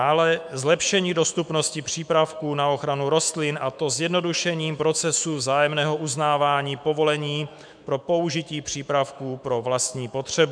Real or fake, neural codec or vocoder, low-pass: fake; autoencoder, 48 kHz, 128 numbers a frame, DAC-VAE, trained on Japanese speech; 9.9 kHz